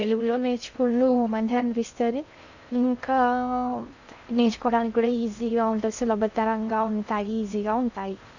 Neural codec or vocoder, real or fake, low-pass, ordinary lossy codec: codec, 16 kHz in and 24 kHz out, 0.6 kbps, FocalCodec, streaming, 2048 codes; fake; 7.2 kHz; none